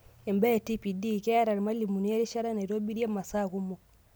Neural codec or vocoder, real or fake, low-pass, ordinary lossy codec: none; real; none; none